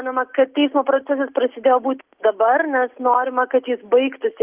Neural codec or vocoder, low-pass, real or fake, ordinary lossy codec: none; 3.6 kHz; real; Opus, 32 kbps